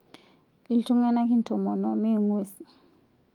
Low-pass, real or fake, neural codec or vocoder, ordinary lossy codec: 19.8 kHz; fake; autoencoder, 48 kHz, 128 numbers a frame, DAC-VAE, trained on Japanese speech; Opus, 32 kbps